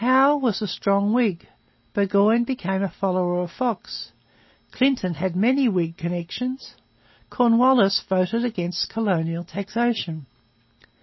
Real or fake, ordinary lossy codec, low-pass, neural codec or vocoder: real; MP3, 24 kbps; 7.2 kHz; none